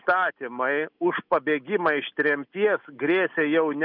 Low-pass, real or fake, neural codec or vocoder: 5.4 kHz; real; none